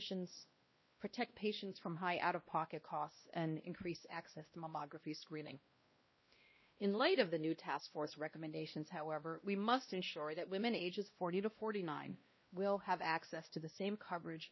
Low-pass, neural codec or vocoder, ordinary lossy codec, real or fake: 7.2 kHz; codec, 16 kHz, 1 kbps, X-Codec, WavLM features, trained on Multilingual LibriSpeech; MP3, 24 kbps; fake